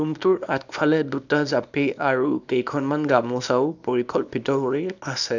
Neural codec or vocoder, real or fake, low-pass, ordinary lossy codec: codec, 24 kHz, 0.9 kbps, WavTokenizer, small release; fake; 7.2 kHz; none